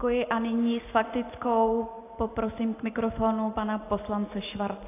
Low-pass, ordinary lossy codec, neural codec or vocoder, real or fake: 3.6 kHz; AAC, 24 kbps; none; real